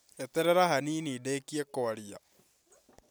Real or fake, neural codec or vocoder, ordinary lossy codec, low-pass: real; none; none; none